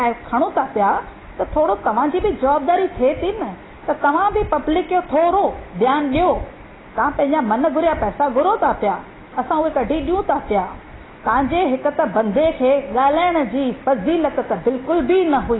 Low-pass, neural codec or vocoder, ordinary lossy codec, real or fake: 7.2 kHz; none; AAC, 16 kbps; real